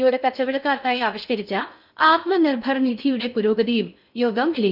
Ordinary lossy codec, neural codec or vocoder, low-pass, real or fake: none; codec, 16 kHz in and 24 kHz out, 0.6 kbps, FocalCodec, streaming, 4096 codes; 5.4 kHz; fake